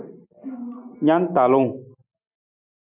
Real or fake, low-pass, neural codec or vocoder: real; 3.6 kHz; none